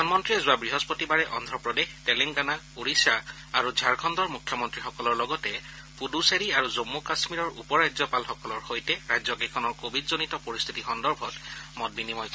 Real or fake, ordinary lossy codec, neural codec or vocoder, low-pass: real; none; none; none